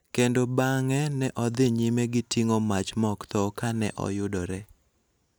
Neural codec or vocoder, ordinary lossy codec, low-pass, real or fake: none; none; none; real